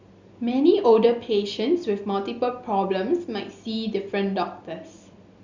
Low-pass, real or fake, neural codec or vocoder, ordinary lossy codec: 7.2 kHz; real; none; Opus, 64 kbps